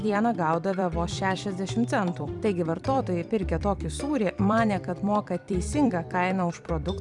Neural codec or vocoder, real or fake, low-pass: vocoder, 44.1 kHz, 128 mel bands every 256 samples, BigVGAN v2; fake; 10.8 kHz